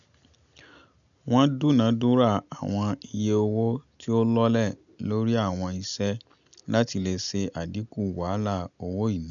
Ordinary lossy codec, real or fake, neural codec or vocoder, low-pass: none; real; none; 7.2 kHz